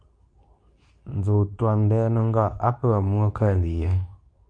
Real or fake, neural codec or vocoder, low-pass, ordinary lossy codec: fake; codec, 24 kHz, 0.9 kbps, WavTokenizer, medium speech release version 2; 9.9 kHz; MP3, 64 kbps